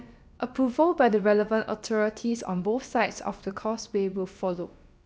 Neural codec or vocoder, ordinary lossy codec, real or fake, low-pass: codec, 16 kHz, about 1 kbps, DyCAST, with the encoder's durations; none; fake; none